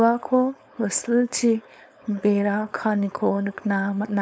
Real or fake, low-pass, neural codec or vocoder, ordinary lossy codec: fake; none; codec, 16 kHz, 4.8 kbps, FACodec; none